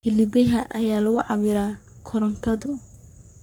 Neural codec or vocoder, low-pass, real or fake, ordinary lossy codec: codec, 44.1 kHz, 3.4 kbps, Pupu-Codec; none; fake; none